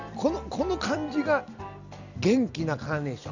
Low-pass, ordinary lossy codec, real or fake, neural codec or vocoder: 7.2 kHz; none; real; none